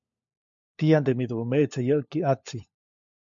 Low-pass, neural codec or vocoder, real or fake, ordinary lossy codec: 7.2 kHz; codec, 16 kHz, 4 kbps, FunCodec, trained on LibriTTS, 50 frames a second; fake; MP3, 48 kbps